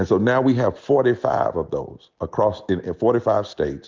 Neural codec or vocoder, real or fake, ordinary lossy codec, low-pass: none; real; Opus, 32 kbps; 7.2 kHz